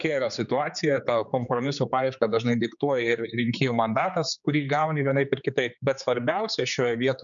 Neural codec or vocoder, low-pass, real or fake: codec, 16 kHz, 4 kbps, X-Codec, HuBERT features, trained on general audio; 7.2 kHz; fake